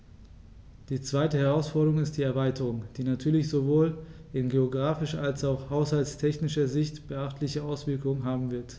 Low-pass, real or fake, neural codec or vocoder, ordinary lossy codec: none; real; none; none